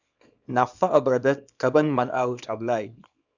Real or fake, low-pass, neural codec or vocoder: fake; 7.2 kHz; codec, 24 kHz, 0.9 kbps, WavTokenizer, small release